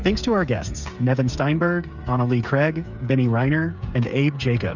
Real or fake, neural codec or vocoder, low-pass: fake; codec, 16 kHz, 8 kbps, FreqCodec, smaller model; 7.2 kHz